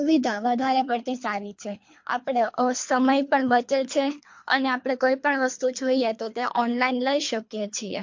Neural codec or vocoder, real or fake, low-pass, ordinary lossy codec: codec, 24 kHz, 3 kbps, HILCodec; fake; 7.2 kHz; MP3, 48 kbps